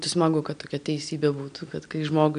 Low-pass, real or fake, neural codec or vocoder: 9.9 kHz; real; none